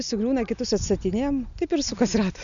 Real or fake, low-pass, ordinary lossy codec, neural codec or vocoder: real; 7.2 kHz; MP3, 96 kbps; none